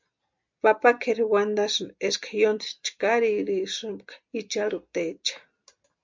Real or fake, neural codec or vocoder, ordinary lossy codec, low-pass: real; none; MP3, 64 kbps; 7.2 kHz